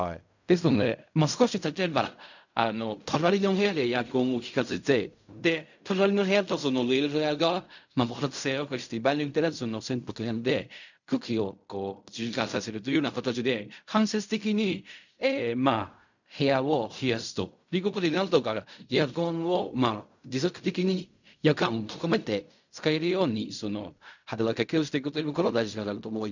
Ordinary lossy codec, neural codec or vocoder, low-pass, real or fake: none; codec, 16 kHz in and 24 kHz out, 0.4 kbps, LongCat-Audio-Codec, fine tuned four codebook decoder; 7.2 kHz; fake